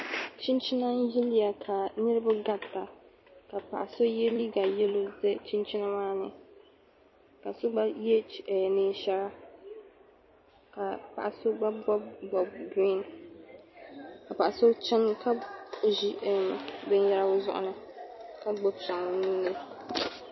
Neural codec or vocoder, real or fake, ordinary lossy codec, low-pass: vocoder, 44.1 kHz, 128 mel bands every 256 samples, BigVGAN v2; fake; MP3, 24 kbps; 7.2 kHz